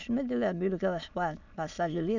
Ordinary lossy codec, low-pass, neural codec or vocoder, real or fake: none; 7.2 kHz; autoencoder, 22.05 kHz, a latent of 192 numbers a frame, VITS, trained on many speakers; fake